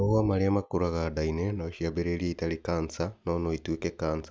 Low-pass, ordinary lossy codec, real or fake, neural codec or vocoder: none; none; real; none